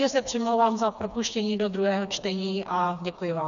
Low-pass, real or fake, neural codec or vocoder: 7.2 kHz; fake; codec, 16 kHz, 2 kbps, FreqCodec, smaller model